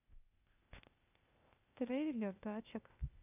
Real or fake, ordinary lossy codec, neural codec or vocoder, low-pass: fake; none; codec, 16 kHz, 0.8 kbps, ZipCodec; 3.6 kHz